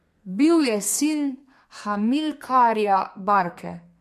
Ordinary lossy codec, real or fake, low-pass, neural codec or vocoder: MP3, 64 kbps; fake; 14.4 kHz; codec, 32 kHz, 1.9 kbps, SNAC